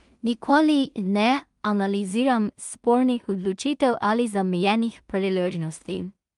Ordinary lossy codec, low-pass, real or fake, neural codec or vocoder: Opus, 32 kbps; 10.8 kHz; fake; codec, 16 kHz in and 24 kHz out, 0.4 kbps, LongCat-Audio-Codec, two codebook decoder